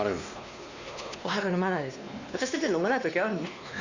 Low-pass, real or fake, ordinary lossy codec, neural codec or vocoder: 7.2 kHz; fake; Opus, 64 kbps; codec, 16 kHz, 2 kbps, X-Codec, WavLM features, trained on Multilingual LibriSpeech